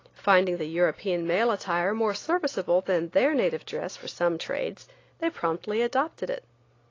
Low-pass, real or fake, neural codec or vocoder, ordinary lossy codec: 7.2 kHz; real; none; AAC, 32 kbps